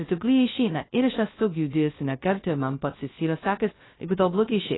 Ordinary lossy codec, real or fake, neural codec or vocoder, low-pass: AAC, 16 kbps; fake; codec, 16 kHz, 0.2 kbps, FocalCodec; 7.2 kHz